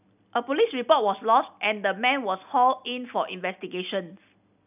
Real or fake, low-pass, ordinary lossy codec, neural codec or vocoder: real; 3.6 kHz; none; none